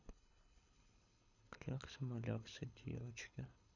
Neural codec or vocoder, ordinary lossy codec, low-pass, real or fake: codec, 24 kHz, 6 kbps, HILCodec; none; 7.2 kHz; fake